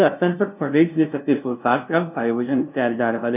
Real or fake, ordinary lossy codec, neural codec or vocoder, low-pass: fake; none; codec, 16 kHz, 0.5 kbps, FunCodec, trained on LibriTTS, 25 frames a second; 3.6 kHz